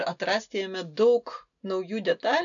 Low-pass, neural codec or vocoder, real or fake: 7.2 kHz; none; real